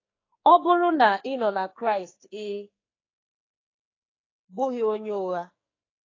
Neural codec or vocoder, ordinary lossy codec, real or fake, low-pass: codec, 44.1 kHz, 2.6 kbps, SNAC; AAC, 32 kbps; fake; 7.2 kHz